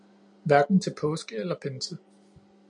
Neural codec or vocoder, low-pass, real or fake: none; 9.9 kHz; real